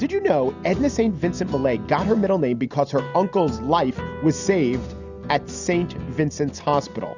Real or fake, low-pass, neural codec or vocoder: real; 7.2 kHz; none